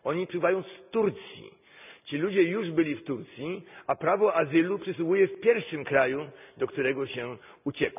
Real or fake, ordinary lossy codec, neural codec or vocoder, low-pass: real; none; none; 3.6 kHz